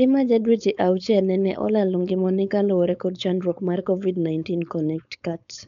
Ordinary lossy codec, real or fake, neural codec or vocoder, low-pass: none; fake; codec, 16 kHz, 4.8 kbps, FACodec; 7.2 kHz